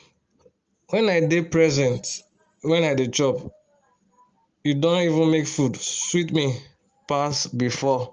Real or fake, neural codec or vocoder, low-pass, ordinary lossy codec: real; none; 7.2 kHz; Opus, 24 kbps